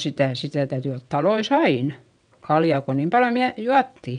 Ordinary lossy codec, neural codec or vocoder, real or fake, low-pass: none; vocoder, 22.05 kHz, 80 mel bands, WaveNeXt; fake; 9.9 kHz